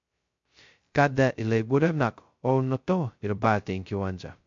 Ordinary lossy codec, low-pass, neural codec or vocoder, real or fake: MP3, 48 kbps; 7.2 kHz; codec, 16 kHz, 0.2 kbps, FocalCodec; fake